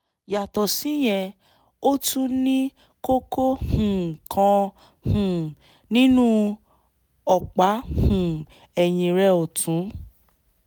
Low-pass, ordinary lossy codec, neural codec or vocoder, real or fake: none; none; none; real